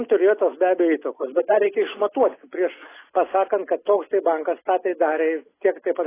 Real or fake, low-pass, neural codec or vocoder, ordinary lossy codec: real; 3.6 kHz; none; AAC, 16 kbps